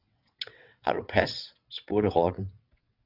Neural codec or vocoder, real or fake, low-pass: vocoder, 22.05 kHz, 80 mel bands, WaveNeXt; fake; 5.4 kHz